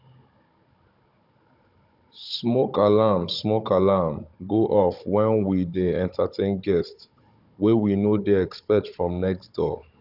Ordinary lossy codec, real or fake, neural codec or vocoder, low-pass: none; fake; codec, 16 kHz, 16 kbps, FunCodec, trained on Chinese and English, 50 frames a second; 5.4 kHz